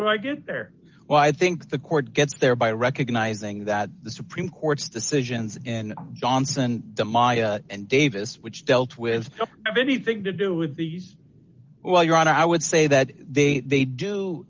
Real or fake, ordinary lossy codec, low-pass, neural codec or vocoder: real; Opus, 32 kbps; 7.2 kHz; none